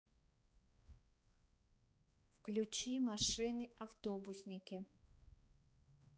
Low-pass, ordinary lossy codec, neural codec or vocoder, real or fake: none; none; codec, 16 kHz, 2 kbps, X-Codec, HuBERT features, trained on balanced general audio; fake